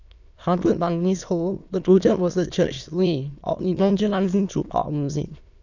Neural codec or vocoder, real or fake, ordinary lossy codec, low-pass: autoencoder, 22.05 kHz, a latent of 192 numbers a frame, VITS, trained on many speakers; fake; none; 7.2 kHz